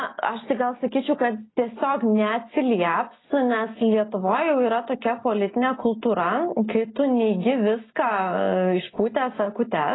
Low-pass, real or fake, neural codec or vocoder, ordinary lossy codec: 7.2 kHz; real; none; AAC, 16 kbps